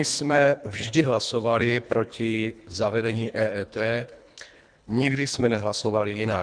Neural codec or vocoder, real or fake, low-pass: codec, 24 kHz, 1.5 kbps, HILCodec; fake; 9.9 kHz